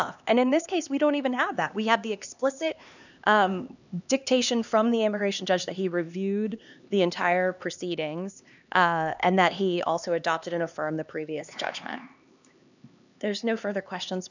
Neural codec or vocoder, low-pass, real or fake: codec, 16 kHz, 2 kbps, X-Codec, HuBERT features, trained on LibriSpeech; 7.2 kHz; fake